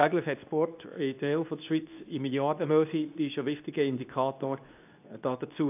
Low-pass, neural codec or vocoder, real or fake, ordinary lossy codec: 3.6 kHz; codec, 24 kHz, 0.9 kbps, WavTokenizer, medium speech release version 2; fake; AAC, 32 kbps